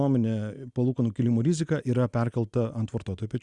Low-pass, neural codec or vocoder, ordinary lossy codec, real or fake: 10.8 kHz; none; Opus, 64 kbps; real